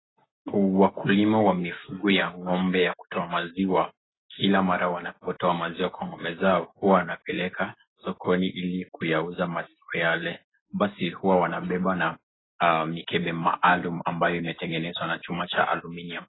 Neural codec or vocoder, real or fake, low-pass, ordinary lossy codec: none; real; 7.2 kHz; AAC, 16 kbps